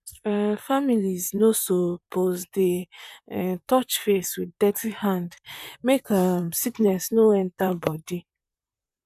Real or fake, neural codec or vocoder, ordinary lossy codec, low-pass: fake; vocoder, 44.1 kHz, 128 mel bands, Pupu-Vocoder; none; 14.4 kHz